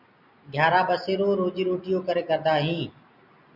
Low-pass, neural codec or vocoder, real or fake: 5.4 kHz; none; real